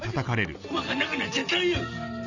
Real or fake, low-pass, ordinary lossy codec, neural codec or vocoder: real; 7.2 kHz; none; none